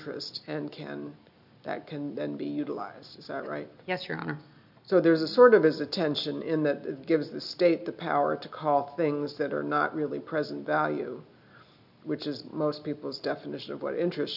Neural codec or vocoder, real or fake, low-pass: none; real; 5.4 kHz